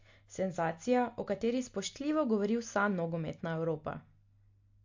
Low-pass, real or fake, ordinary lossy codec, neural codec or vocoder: 7.2 kHz; real; MP3, 48 kbps; none